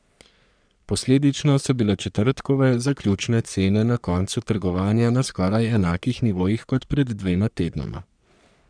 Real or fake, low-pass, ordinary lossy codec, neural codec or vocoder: fake; 9.9 kHz; none; codec, 44.1 kHz, 3.4 kbps, Pupu-Codec